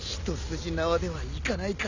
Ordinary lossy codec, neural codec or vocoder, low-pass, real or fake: none; none; 7.2 kHz; real